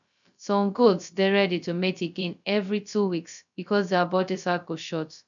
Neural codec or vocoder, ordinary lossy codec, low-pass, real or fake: codec, 16 kHz, 0.2 kbps, FocalCodec; none; 7.2 kHz; fake